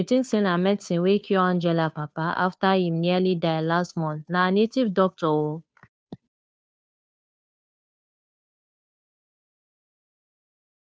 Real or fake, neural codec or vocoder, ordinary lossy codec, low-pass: fake; codec, 16 kHz, 2 kbps, FunCodec, trained on Chinese and English, 25 frames a second; none; none